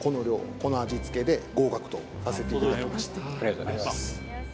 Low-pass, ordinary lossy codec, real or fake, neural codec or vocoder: none; none; real; none